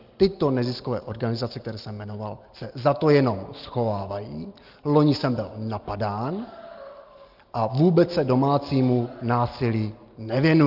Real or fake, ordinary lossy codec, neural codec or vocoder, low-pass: real; Opus, 32 kbps; none; 5.4 kHz